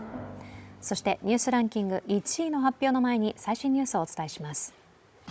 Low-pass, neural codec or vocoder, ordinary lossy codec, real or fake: none; codec, 16 kHz, 16 kbps, FunCodec, trained on Chinese and English, 50 frames a second; none; fake